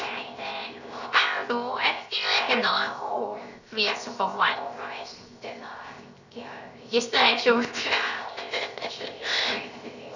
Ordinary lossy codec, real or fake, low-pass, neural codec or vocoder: none; fake; 7.2 kHz; codec, 16 kHz, 0.7 kbps, FocalCodec